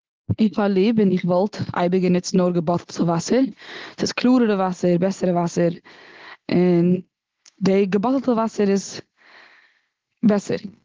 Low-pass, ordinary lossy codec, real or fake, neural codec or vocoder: 7.2 kHz; Opus, 16 kbps; real; none